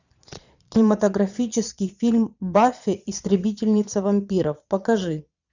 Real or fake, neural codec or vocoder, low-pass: fake; vocoder, 22.05 kHz, 80 mel bands, Vocos; 7.2 kHz